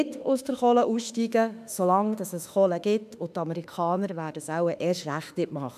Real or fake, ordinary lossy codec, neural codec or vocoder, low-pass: fake; none; autoencoder, 48 kHz, 32 numbers a frame, DAC-VAE, trained on Japanese speech; 14.4 kHz